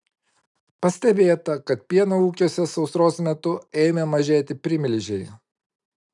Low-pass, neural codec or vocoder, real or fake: 10.8 kHz; none; real